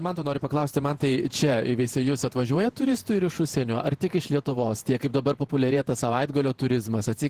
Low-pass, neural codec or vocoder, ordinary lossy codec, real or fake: 14.4 kHz; vocoder, 48 kHz, 128 mel bands, Vocos; Opus, 16 kbps; fake